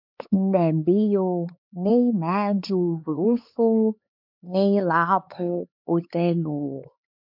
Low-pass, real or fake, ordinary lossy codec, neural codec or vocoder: 5.4 kHz; fake; MP3, 48 kbps; codec, 16 kHz, 4 kbps, X-Codec, HuBERT features, trained on LibriSpeech